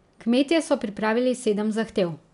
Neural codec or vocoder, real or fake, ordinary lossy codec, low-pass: none; real; none; 10.8 kHz